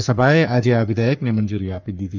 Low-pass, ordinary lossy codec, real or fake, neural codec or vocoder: 7.2 kHz; none; fake; codec, 44.1 kHz, 3.4 kbps, Pupu-Codec